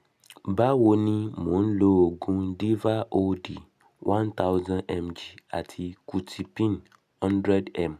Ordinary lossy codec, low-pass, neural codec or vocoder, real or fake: none; 14.4 kHz; none; real